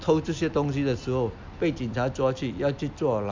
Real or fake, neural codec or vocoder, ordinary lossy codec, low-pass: real; none; MP3, 64 kbps; 7.2 kHz